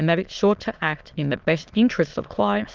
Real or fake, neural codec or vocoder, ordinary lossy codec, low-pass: fake; autoencoder, 22.05 kHz, a latent of 192 numbers a frame, VITS, trained on many speakers; Opus, 32 kbps; 7.2 kHz